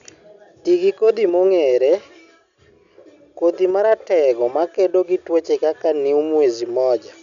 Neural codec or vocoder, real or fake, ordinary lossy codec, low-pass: none; real; none; 7.2 kHz